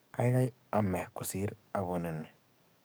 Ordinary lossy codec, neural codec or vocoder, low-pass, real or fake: none; codec, 44.1 kHz, 7.8 kbps, DAC; none; fake